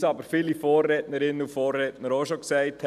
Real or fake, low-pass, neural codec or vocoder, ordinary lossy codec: real; 14.4 kHz; none; none